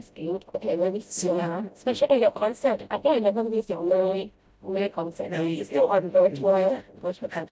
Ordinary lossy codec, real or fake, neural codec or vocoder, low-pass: none; fake; codec, 16 kHz, 0.5 kbps, FreqCodec, smaller model; none